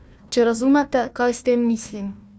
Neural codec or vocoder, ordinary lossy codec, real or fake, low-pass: codec, 16 kHz, 1 kbps, FunCodec, trained on Chinese and English, 50 frames a second; none; fake; none